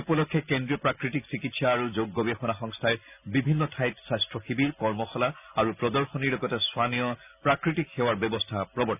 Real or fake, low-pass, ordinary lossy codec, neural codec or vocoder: real; 3.6 kHz; none; none